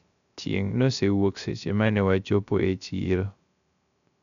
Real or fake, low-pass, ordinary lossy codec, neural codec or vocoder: fake; 7.2 kHz; none; codec, 16 kHz, 0.3 kbps, FocalCodec